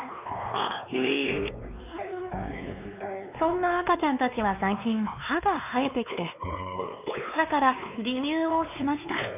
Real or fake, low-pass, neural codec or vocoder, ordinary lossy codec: fake; 3.6 kHz; codec, 16 kHz, 2 kbps, X-Codec, WavLM features, trained on Multilingual LibriSpeech; none